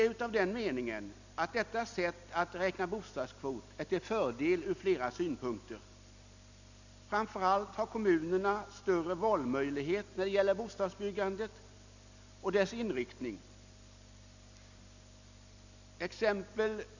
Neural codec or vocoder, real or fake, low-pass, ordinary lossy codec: none; real; 7.2 kHz; none